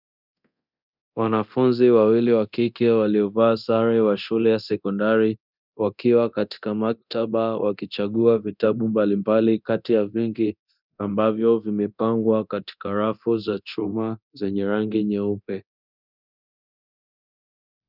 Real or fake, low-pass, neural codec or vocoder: fake; 5.4 kHz; codec, 24 kHz, 0.9 kbps, DualCodec